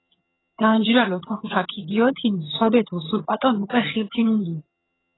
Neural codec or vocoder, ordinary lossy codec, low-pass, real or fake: vocoder, 22.05 kHz, 80 mel bands, HiFi-GAN; AAC, 16 kbps; 7.2 kHz; fake